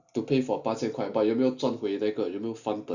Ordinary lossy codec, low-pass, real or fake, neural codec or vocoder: none; 7.2 kHz; real; none